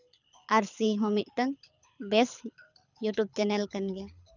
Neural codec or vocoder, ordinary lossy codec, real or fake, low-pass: codec, 24 kHz, 6 kbps, HILCodec; none; fake; 7.2 kHz